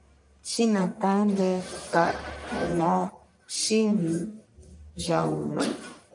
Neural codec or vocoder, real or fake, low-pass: codec, 44.1 kHz, 1.7 kbps, Pupu-Codec; fake; 10.8 kHz